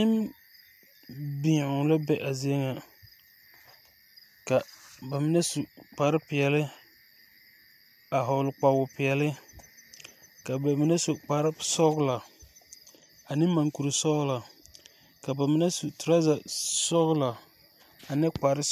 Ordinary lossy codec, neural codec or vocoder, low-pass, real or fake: MP3, 96 kbps; none; 14.4 kHz; real